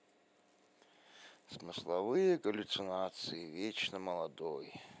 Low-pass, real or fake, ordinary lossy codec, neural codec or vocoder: none; real; none; none